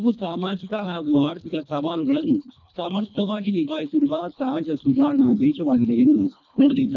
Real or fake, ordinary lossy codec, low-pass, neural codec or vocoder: fake; none; 7.2 kHz; codec, 24 kHz, 1.5 kbps, HILCodec